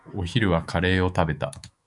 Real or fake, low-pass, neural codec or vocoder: fake; 10.8 kHz; autoencoder, 48 kHz, 128 numbers a frame, DAC-VAE, trained on Japanese speech